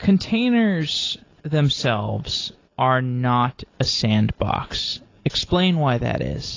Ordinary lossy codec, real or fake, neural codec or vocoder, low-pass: AAC, 32 kbps; real; none; 7.2 kHz